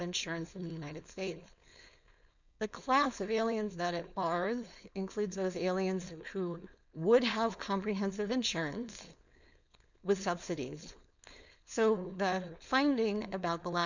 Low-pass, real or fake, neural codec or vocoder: 7.2 kHz; fake; codec, 16 kHz, 4.8 kbps, FACodec